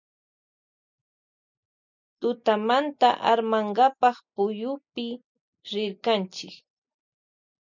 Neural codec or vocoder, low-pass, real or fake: none; 7.2 kHz; real